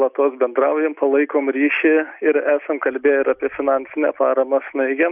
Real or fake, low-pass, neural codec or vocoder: real; 3.6 kHz; none